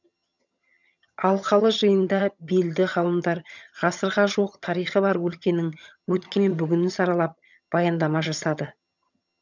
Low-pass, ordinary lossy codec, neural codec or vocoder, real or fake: 7.2 kHz; none; vocoder, 22.05 kHz, 80 mel bands, HiFi-GAN; fake